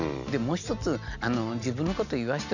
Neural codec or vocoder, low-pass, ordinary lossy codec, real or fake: none; 7.2 kHz; none; real